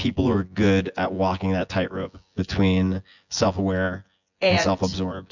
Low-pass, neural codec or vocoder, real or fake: 7.2 kHz; vocoder, 24 kHz, 100 mel bands, Vocos; fake